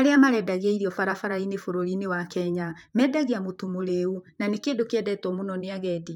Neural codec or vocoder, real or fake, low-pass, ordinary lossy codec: vocoder, 44.1 kHz, 128 mel bands, Pupu-Vocoder; fake; 19.8 kHz; MP3, 96 kbps